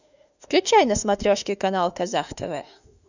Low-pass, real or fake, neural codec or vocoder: 7.2 kHz; fake; autoencoder, 48 kHz, 32 numbers a frame, DAC-VAE, trained on Japanese speech